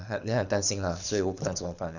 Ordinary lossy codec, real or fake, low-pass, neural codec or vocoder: none; fake; 7.2 kHz; codec, 24 kHz, 6 kbps, HILCodec